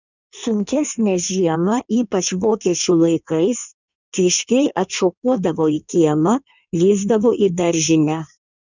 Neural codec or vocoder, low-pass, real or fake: codec, 16 kHz in and 24 kHz out, 1.1 kbps, FireRedTTS-2 codec; 7.2 kHz; fake